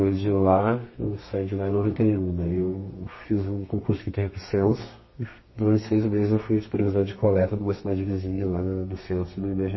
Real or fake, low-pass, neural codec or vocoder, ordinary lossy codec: fake; 7.2 kHz; codec, 32 kHz, 1.9 kbps, SNAC; MP3, 24 kbps